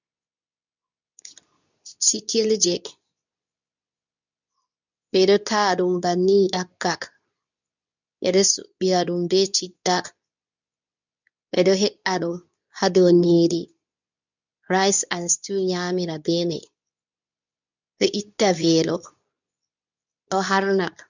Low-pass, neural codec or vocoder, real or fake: 7.2 kHz; codec, 24 kHz, 0.9 kbps, WavTokenizer, medium speech release version 2; fake